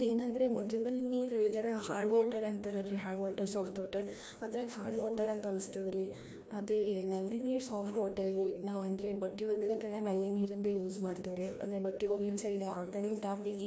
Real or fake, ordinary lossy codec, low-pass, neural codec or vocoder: fake; none; none; codec, 16 kHz, 1 kbps, FreqCodec, larger model